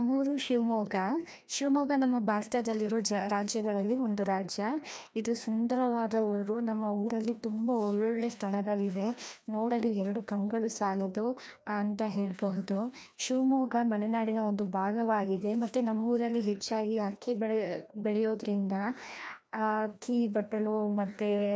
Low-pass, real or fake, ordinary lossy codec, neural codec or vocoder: none; fake; none; codec, 16 kHz, 1 kbps, FreqCodec, larger model